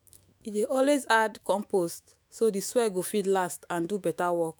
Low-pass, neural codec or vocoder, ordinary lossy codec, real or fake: none; autoencoder, 48 kHz, 128 numbers a frame, DAC-VAE, trained on Japanese speech; none; fake